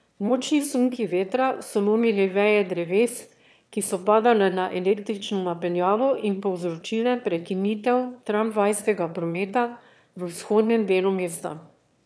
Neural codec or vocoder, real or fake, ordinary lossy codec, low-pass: autoencoder, 22.05 kHz, a latent of 192 numbers a frame, VITS, trained on one speaker; fake; none; none